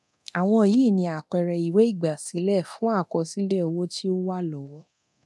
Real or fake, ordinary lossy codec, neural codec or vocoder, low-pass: fake; none; codec, 24 kHz, 0.9 kbps, DualCodec; none